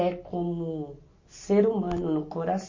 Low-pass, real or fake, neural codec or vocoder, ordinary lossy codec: 7.2 kHz; real; none; MP3, 32 kbps